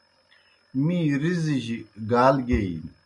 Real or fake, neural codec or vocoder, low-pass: real; none; 10.8 kHz